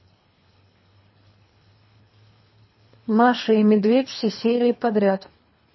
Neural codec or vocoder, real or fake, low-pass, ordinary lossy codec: codec, 24 kHz, 3 kbps, HILCodec; fake; 7.2 kHz; MP3, 24 kbps